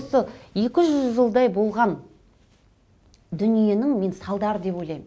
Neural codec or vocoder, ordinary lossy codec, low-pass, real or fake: none; none; none; real